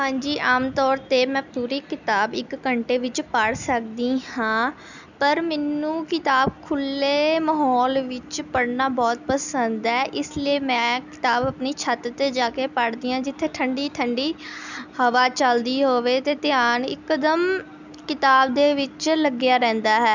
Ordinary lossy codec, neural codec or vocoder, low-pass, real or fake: none; none; 7.2 kHz; real